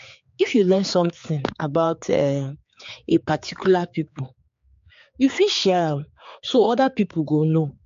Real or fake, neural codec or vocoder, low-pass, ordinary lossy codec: fake; codec, 16 kHz, 4 kbps, X-Codec, HuBERT features, trained on general audio; 7.2 kHz; MP3, 48 kbps